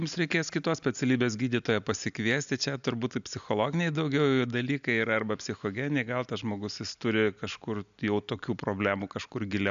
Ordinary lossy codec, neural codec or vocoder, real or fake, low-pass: AAC, 96 kbps; none; real; 7.2 kHz